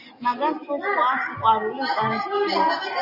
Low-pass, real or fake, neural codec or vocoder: 5.4 kHz; real; none